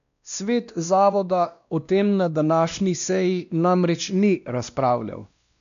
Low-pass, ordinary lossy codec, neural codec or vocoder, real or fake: 7.2 kHz; none; codec, 16 kHz, 1 kbps, X-Codec, WavLM features, trained on Multilingual LibriSpeech; fake